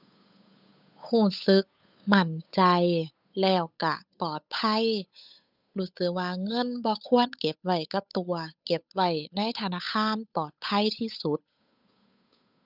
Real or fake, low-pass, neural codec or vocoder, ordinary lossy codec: fake; 5.4 kHz; codec, 16 kHz, 8 kbps, FunCodec, trained on Chinese and English, 25 frames a second; none